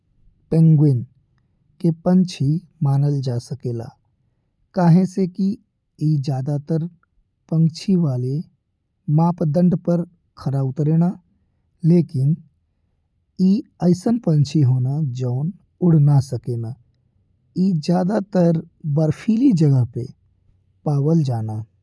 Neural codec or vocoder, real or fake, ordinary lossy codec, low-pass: none; real; none; none